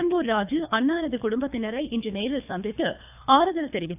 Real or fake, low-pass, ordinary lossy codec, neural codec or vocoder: fake; 3.6 kHz; AAC, 32 kbps; codec, 24 kHz, 3 kbps, HILCodec